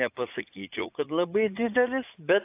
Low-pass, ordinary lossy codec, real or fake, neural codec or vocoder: 3.6 kHz; AAC, 32 kbps; fake; vocoder, 44.1 kHz, 80 mel bands, Vocos